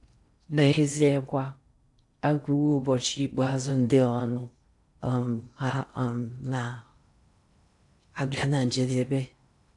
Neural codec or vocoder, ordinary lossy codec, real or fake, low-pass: codec, 16 kHz in and 24 kHz out, 0.6 kbps, FocalCodec, streaming, 4096 codes; AAC, 64 kbps; fake; 10.8 kHz